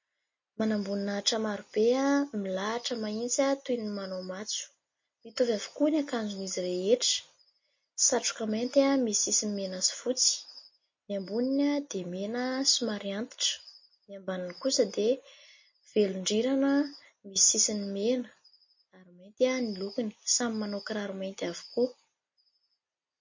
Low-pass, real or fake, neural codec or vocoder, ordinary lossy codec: 7.2 kHz; real; none; MP3, 32 kbps